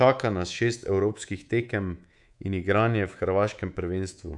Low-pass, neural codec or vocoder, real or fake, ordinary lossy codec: 10.8 kHz; codec, 24 kHz, 3.1 kbps, DualCodec; fake; none